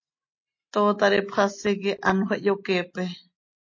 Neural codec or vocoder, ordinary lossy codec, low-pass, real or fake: none; MP3, 32 kbps; 7.2 kHz; real